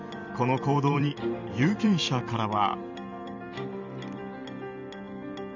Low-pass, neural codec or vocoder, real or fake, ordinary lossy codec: 7.2 kHz; vocoder, 44.1 kHz, 128 mel bands every 512 samples, BigVGAN v2; fake; none